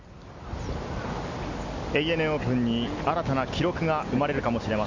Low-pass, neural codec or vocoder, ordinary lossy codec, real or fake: 7.2 kHz; none; Opus, 64 kbps; real